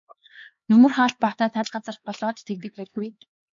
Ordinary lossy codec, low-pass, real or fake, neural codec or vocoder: MP3, 48 kbps; 7.2 kHz; fake; codec, 16 kHz, 4 kbps, X-Codec, HuBERT features, trained on LibriSpeech